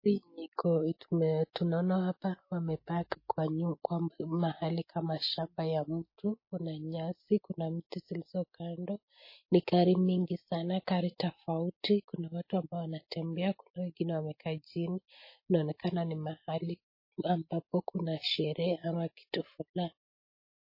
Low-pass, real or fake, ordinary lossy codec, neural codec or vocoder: 5.4 kHz; real; MP3, 24 kbps; none